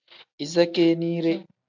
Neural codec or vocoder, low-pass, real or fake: none; 7.2 kHz; real